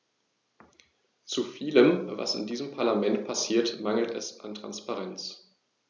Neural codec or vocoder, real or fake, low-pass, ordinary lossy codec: none; real; none; none